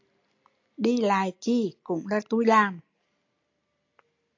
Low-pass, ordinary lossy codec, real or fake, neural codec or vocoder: 7.2 kHz; AAC, 48 kbps; real; none